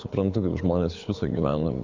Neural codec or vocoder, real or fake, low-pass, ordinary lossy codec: codec, 24 kHz, 6 kbps, HILCodec; fake; 7.2 kHz; MP3, 64 kbps